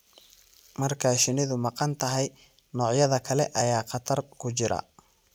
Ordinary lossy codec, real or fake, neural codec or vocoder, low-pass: none; real; none; none